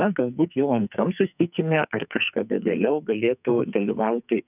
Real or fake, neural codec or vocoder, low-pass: fake; codec, 44.1 kHz, 2.6 kbps, SNAC; 3.6 kHz